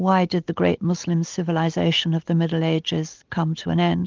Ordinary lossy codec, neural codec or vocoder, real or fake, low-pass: Opus, 24 kbps; none; real; 7.2 kHz